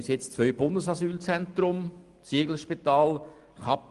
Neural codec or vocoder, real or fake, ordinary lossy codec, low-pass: none; real; Opus, 24 kbps; 10.8 kHz